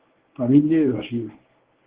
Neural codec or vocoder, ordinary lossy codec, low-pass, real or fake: codec, 24 kHz, 0.9 kbps, WavTokenizer, medium speech release version 1; Opus, 16 kbps; 3.6 kHz; fake